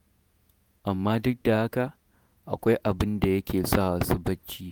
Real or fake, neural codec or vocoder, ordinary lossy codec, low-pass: fake; vocoder, 48 kHz, 128 mel bands, Vocos; none; none